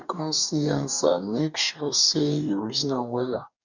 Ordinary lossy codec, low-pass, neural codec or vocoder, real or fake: none; 7.2 kHz; codec, 44.1 kHz, 2.6 kbps, DAC; fake